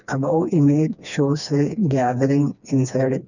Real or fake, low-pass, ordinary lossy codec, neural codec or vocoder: fake; 7.2 kHz; none; codec, 16 kHz, 2 kbps, FreqCodec, smaller model